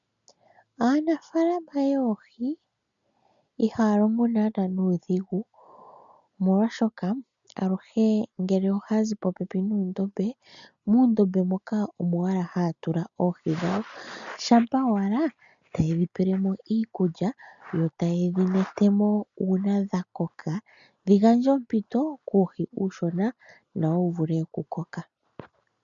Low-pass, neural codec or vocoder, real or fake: 7.2 kHz; none; real